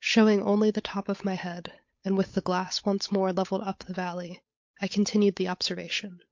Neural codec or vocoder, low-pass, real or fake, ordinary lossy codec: codec, 16 kHz, 8 kbps, FunCodec, trained on Chinese and English, 25 frames a second; 7.2 kHz; fake; MP3, 48 kbps